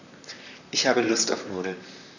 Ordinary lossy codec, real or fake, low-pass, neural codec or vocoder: none; fake; 7.2 kHz; vocoder, 44.1 kHz, 128 mel bands, Pupu-Vocoder